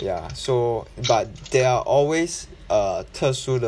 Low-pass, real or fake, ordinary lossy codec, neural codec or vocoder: none; real; none; none